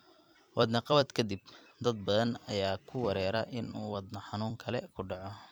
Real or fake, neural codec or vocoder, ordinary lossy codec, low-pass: fake; vocoder, 44.1 kHz, 128 mel bands every 256 samples, BigVGAN v2; none; none